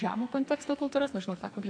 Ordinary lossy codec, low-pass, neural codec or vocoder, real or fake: AAC, 48 kbps; 9.9 kHz; codec, 44.1 kHz, 2.6 kbps, SNAC; fake